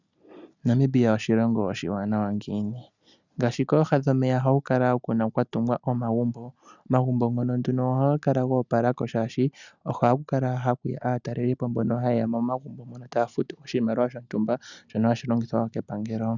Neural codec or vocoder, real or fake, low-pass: none; real; 7.2 kHz